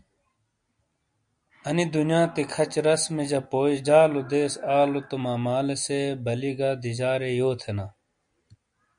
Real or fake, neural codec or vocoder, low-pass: real; none; 9.9 kHz